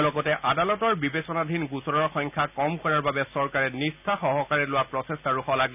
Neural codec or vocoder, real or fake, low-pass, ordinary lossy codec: none; real; 3.6 kHz; none